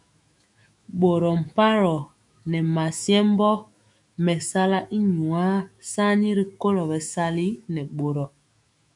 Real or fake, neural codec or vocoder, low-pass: fake; autoencoder, 48 kHz, 128 numbers a frame, DAC-VAE, trained on Japanese speech; 10.8 kHz